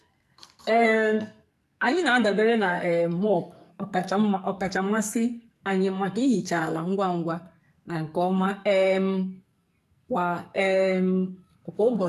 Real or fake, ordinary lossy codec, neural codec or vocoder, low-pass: fake; AAC, 96 kbps; codec, 44.1 kHz, 2.6 kbps, SNAC; 14.4 kHz